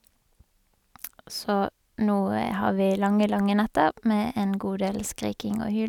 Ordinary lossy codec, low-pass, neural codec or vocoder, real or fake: none; 19.8 kHz; none; real